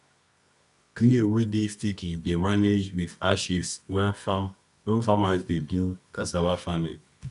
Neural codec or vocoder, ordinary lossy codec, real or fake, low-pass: codec, 24 kHz, 0.9 kbps, WavTokenizer, medium music audio release; none; fake; 10.8 kHz